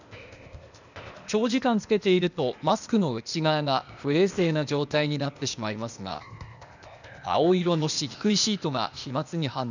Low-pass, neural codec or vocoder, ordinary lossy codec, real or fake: 7.2 kHz; codec, 16 kHz, 0.8 kbps, ZipCodec; none; fake